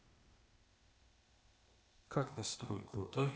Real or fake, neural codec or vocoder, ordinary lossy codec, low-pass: fake; codec, 16 kHz, 0.8 kbps, ZipCodec; none; none